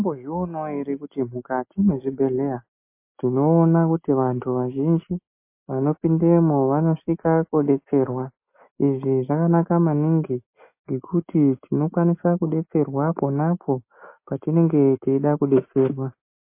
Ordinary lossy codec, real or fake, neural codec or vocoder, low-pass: MP3, 24 kbps; real; none; 3.6 kHz